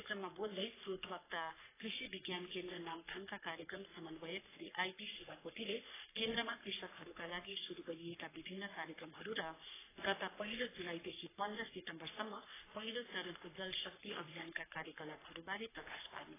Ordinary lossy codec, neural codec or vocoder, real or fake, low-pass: AAC, 16 kbps; codec, 44.1 kHz, 3.4 kbps, Pupu-Codec; fake; 3.6 kHz